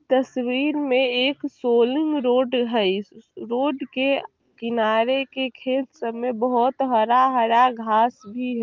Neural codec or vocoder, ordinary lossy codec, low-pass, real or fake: none; Opus, 24 kbps; 7.2 kHz; real